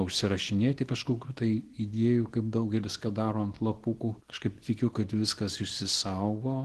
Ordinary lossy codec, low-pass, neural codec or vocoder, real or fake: Opus, 16 kbps; 10.8 kHz; codec, 24 kHz, 0.9 kbps, WavTokenizer, small release; fake